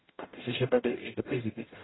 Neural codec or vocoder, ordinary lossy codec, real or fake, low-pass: codec, 44.1 kHz, 0.9 kbps, DAC; AAC, 16 kbps; fake; 7.2 kHz